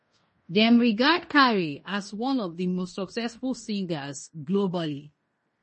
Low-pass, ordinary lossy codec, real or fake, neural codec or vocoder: 10.8 kHz; MP3, 32 kbps; fake; codec, 16 kHz in and 24 kHz out, 0.9 kbps, LongCat-Audio-Codec, fine tuned four codebook decoder